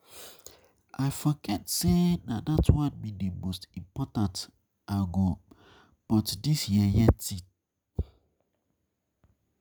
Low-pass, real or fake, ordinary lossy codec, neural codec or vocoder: none; real; none; none